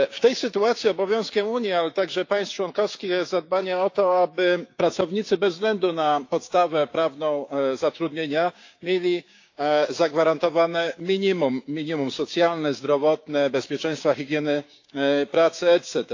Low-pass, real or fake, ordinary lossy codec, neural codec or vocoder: 7.2 kHz; fake; AAC, 48 kbps; codec, 16 kHz, 6 kbps, DAC